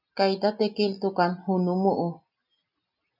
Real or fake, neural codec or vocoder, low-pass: real; none; 5.4 kHz